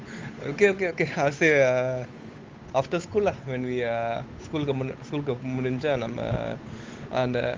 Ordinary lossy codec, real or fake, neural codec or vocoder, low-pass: Opus, 32 kbps; fake; codec, 16 kHz, 8 kbps, FunCodec, trained on Chinese and English, 25 frames a second; 7.2 kHz